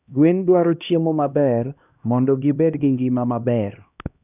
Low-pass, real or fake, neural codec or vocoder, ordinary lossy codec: 3.6 kHz; fake; codec, 16 kHz, 1 kbps, X-Codec, HuBERT features, trained on LibriSpeech; none